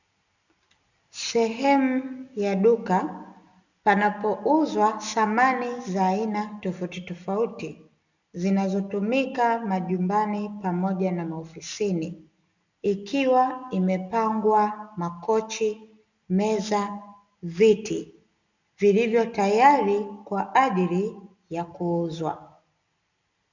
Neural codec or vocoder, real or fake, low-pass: none; real; 7.2 kHz